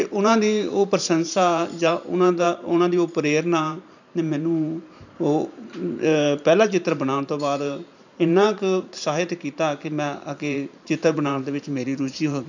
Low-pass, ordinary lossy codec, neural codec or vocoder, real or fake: 7.2 kHz; none; vocoder, 44.1 kHz, 128 mel bands every 512 samples, BigVGAN v2; fake